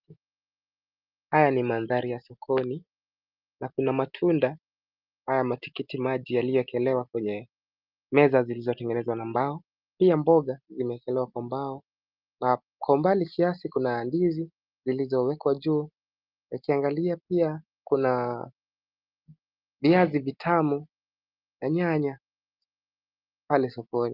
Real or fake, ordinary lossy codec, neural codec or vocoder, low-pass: real; Opus, 24 kbps; none; 5.4 kHz